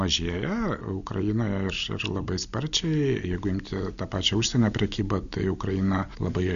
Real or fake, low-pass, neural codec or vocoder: real; 7.2 kHz; none